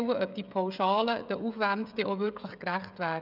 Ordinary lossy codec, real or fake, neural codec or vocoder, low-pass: none; fake; codec, 16 kHz, 16 kbps, FreqCodec, smaller model; 5.4 kHz